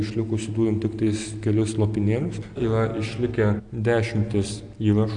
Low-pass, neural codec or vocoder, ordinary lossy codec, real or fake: 9.9 kHz; none; AAC, 64 kbps; real